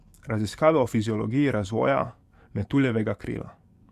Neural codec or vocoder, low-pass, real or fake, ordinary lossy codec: codec, 44.1 kHz, 7.8 kbps, DAC; 14.4 kHz; fake; Opus, 64 kbps